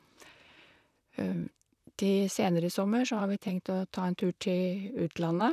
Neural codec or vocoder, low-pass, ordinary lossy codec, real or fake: vocoder, 44.1 kHz, 128 mel bands, Pupu-Vocoder; 14.4 kHz; none; fake